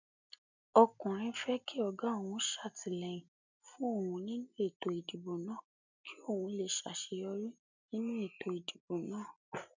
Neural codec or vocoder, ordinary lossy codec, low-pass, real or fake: none; none; 7.2 kHz; real